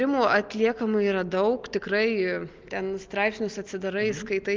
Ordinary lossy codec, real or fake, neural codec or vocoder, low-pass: Opus, 24 kbps; real; none; 7.2 kHz